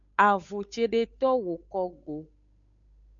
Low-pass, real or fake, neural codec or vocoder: 7.2 kHz; fake; codec, 16 kHz, 8 kbps, FunCodec, trained on Chinese and English, 25 frames a second